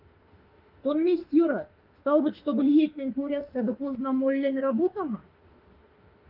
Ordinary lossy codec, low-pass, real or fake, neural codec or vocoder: Opus, 32 kbps; 5.4 kHz; fake; autoencoder, 48 kHz, 32 numbers a frame, DAC-VAE, trained on Japanese speech